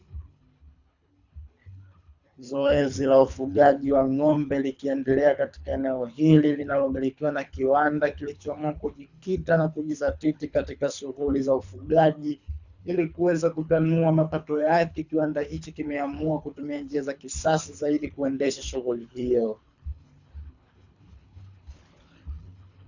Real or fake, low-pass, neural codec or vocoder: fake; 7.2 kHz; codec, 24 kHz, 3 kbps, HILCodec